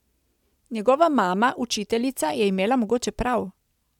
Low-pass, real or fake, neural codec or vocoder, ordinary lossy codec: 19.8 kHz; real; none; none